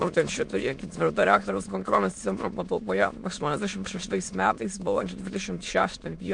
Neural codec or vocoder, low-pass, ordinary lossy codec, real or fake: autoencoder, 22.05 kHz, a latent of 192 numbers a frame, VITS, trained on many speakers; 9.9 kHz; MP3, 64 kbps; fake